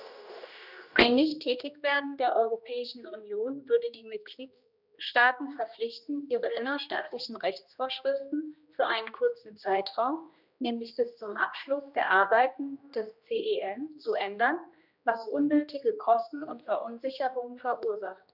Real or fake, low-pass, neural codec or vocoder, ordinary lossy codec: fake; 5.4 kHz; codec, 16 kHz, 1 kbps, X-Codec, HuBERT features, trained on general audio; none